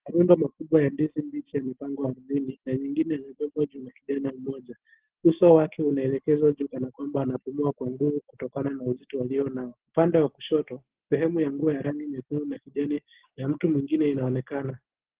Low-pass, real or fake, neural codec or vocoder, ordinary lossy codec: 3.6 kHz; real; none; Opus, 16 kbps